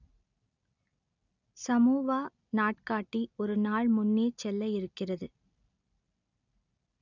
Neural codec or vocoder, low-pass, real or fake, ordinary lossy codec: none; 7.2 kHz; real; none